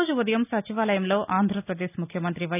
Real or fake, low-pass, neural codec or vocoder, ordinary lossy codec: real; 3.6 kHz; none; none